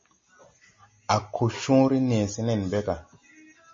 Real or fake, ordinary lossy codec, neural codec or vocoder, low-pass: real; MP3, 32 kbps; none; 7.2 kHz